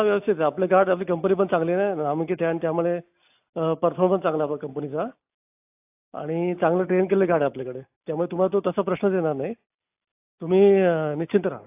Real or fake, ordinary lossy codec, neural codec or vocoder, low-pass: real; AAC, 32 kbps; none; 3.6 kHz